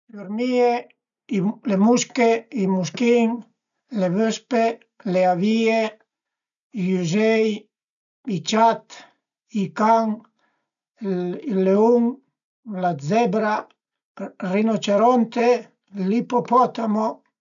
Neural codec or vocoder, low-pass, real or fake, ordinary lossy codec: none; 7.2 kHz; real; none